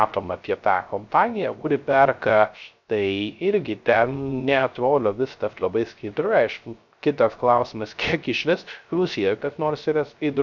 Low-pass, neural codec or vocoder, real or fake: 7.2 kHz; codec, 16 kHz, 0.3 kbps, FocalCodec; fake